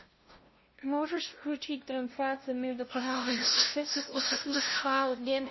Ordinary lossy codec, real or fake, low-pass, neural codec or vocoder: MP3, 24 kbps; fake; 7.2 kHz; codec, 16 kHz, 0.5 kbps, FunCodec, trained on LibriTTS, 25 frames a second